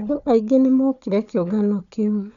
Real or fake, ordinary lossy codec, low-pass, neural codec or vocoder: fake; none; 7.2 kHz; codec, 16 kHz, 4 kbps, FunCodec, trained on Chinese and English, 50 frames a second